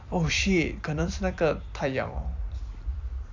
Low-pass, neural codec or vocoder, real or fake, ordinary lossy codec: 7.2 kHz; vocoder, 44.1 kHz, 128 mel bands every 256 samples, BigVGAN v2; fake; MP3, 64 kbps